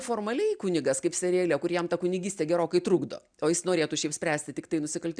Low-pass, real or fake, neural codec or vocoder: 9.9 kHz; real; none